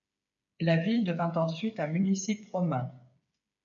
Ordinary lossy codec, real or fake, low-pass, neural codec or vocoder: AAC, 64 kbps; fake; 7.2 kHz; codec, 16 kHz, 8 kbps, FreqCodec, smaller model